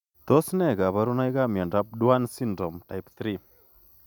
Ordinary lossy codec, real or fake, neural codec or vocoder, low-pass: none; real; none; 19.8 kHz